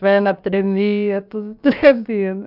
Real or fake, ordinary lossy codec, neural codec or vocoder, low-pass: fake; none; codec, 16 kHz, about 1 kbps, DyCAST, with the encoder's durations; 5.4 kHz